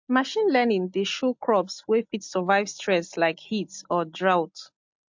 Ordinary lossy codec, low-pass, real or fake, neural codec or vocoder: MP3, 48 kbps; 7.2 kHz; real; none